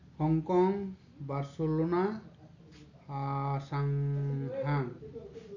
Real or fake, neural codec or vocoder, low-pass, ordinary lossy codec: real; none; 7.2 kHz; none